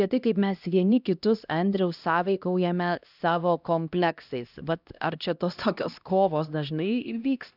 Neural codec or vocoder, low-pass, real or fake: codec, 16 kHz, 1 kbps, X-Codec, HuBERT features, trained on LibriSpeech; 5.4 kHz; fake